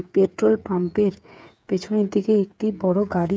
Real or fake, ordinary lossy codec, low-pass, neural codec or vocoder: fake; none; none; codec, 16 kHz, 8 kbps, FreqCodec, smaller model